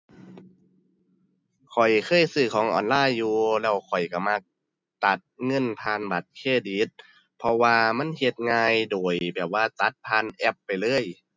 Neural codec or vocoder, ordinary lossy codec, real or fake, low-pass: none; none; real; none